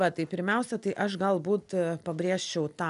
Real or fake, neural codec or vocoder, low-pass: real; none; 10.8 kHz